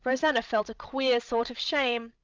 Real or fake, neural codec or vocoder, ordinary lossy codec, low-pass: fake; codec, 16 kHz, 16 kbps, FreqCodec, larger model; Opus, 24 kbps; 7.2 kHz